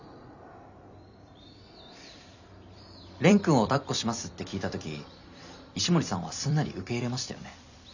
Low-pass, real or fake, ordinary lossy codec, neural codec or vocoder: 7.2 kHz; fake; none; vocoder, 44.1 kHz, 128 mel bands every 256 samples, BigVGAN v2